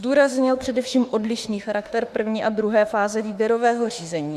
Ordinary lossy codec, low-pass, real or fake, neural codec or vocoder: Opus, 64 kbps; 14.4 kHz; fake; autoencoder, 48 kHz, 32 numbers a frame, DAC-VAE, trained on Japanese speech